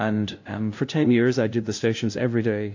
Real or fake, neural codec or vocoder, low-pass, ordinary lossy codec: fake; codec, 16 kHz, 0.5 kbps, FunCodec, trained on LibriTTS, 25 frames a second; 7.2 kHz; AAC, 48 kbps